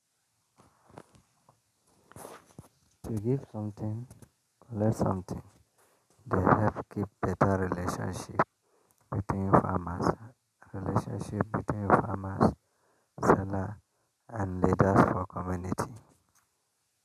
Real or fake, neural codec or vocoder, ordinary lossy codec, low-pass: real; none; none; 14.4 kHz